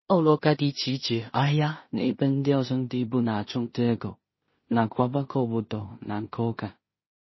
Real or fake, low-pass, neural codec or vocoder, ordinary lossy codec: fake; 7.2 kHz; codec, 16 kHz in and 24 kHz out, 0.4 kbps, LongCat-Audio-Codec, two codebook decoder; MP3, 24 kbps